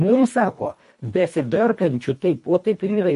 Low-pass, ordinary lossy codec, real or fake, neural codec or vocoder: 10.8 kHz; MP3, 48 kbps; fake; codec, 24 kHz, 1.5 kbps, HILCodec